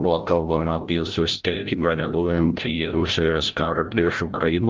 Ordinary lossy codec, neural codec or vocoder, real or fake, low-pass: Opus, 32 kbps; codec, 16 kHz, 0.5 kbps, FreqCodec, larger model; fake; 7.2 kHz